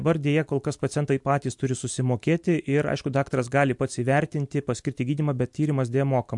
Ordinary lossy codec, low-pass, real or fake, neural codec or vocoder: MP3, 64 kbps; 10.8 kHz; real; none